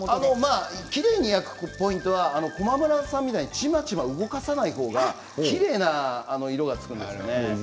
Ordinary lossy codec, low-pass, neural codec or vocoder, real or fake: none; none; none; real